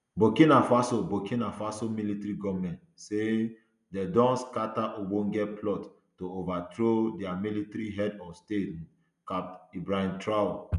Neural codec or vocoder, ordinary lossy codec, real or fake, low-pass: none; none; real; 10.8 kHz